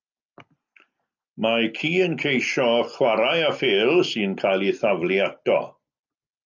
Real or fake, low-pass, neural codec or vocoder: real; 7.2 kHz; none